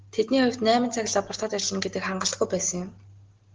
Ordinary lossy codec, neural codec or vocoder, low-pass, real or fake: Opus, 16 kbps; none; 7.2 kHz; real